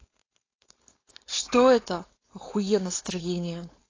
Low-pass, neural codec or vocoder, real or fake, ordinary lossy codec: 7.2 kHz; codec, 16 kHz, 4.8 kbps, FACodec; fake; AAC, 32 kbps